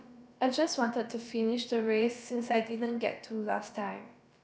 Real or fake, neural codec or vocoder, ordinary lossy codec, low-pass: fake; codec, 16 kHz, about 1 kbps, DyCAST, with the encoder's durations; none; none